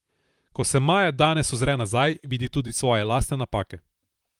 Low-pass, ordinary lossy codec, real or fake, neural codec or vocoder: 19.8 kHz; Opus, 32 kbps; fake; vocoder, 44.1 kHz, 128 mel bands, Pupu-Vocoder